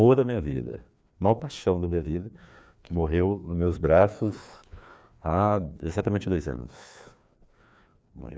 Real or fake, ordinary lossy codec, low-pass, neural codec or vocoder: fake; none; none; codec, 16 kHz, 2 kbps, FreqCodec, larger model